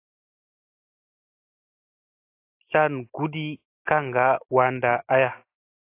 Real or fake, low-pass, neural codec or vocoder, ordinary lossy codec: real; 3.6 kHz; none; AAC, 24 kbps